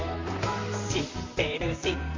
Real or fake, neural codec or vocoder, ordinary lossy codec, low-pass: fake; vocoder, 44.1 kHz, 128 mel bands, Pupu-Vocoder; MP3, 48 kbps; 7.2 kHz